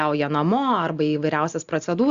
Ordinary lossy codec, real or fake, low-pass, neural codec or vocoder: Opus, 64 kbps; real; 7.2 kHz; none